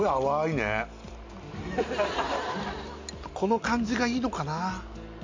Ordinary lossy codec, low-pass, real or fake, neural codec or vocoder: MP3, 48 kbps; 7.2 kHz; real; none